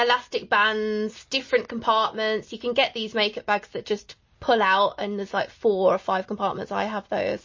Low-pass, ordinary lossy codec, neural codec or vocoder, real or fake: 7.2 kHz; MP3, 32 kbps; none; real